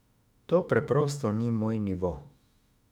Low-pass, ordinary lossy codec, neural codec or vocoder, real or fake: 19.8 kHz; none; autoencoder, 48 kHz, 32 numbers a frame, DAC-VAE, trained on Japanese speech; fake